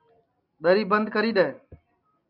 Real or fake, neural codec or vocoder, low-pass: real; none; 5.4 kHz